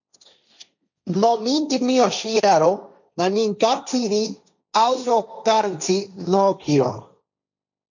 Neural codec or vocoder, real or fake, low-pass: codec, 16 kHz, 1.1 kbps, Voila-Tokenizer; fake; 7.2 kHz